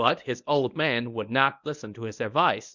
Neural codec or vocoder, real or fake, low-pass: codec, 24 kHz, 0.9 kbps, WavTokenizer, medium speech release version 1; fake; 7.2 kHz